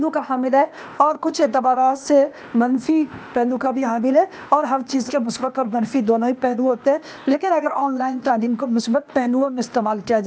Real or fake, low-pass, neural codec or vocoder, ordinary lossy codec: fake; none; codec, 16 kHz, 0.8 kbps, ZipCodec; none